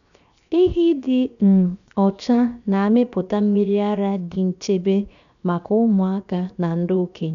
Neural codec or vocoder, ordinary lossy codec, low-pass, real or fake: codec, 16 kHz, 0.7 kbps, FocalCodec; MP3, 96 kbps; 7.2 kHz; fake